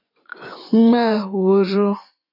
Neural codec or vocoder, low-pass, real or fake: vocoder, 24 kHz, 100 mel bands, Vocos; 5.4 kHz; fake